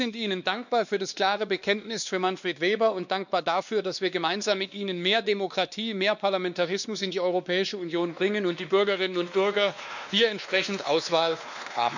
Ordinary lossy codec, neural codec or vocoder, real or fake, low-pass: none; codec, 16 kHz, 2 kbps, X-Codec, WavLM features, trained on Multilingual LibriSpeech; fake; 7.2 kHz